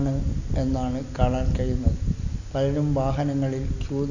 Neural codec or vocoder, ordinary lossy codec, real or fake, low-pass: none; none; real; 7.2 kHz